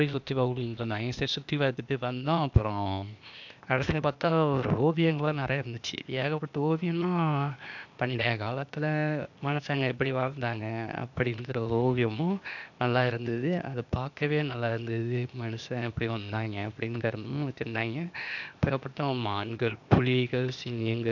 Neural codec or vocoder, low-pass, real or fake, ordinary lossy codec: codec, 16 kHz, 0.8 kbps, ZipCodec; 7.2 kHz; fake; none